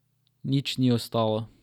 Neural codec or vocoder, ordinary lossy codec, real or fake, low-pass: none; none; real; 19.8 kHz